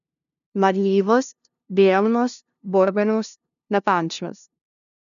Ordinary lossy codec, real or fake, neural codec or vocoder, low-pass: none; fake; codec, 16 kHz, 0.5 kbps, FunCodec, trained on LibriTTS, 25 frames a second; 7.2 kHz